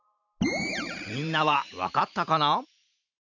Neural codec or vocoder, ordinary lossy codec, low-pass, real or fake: vocoder, 44.1 kHz, 128 mel bands every 512 samples, BigVGAN v2; none; 7.2 kHz; fake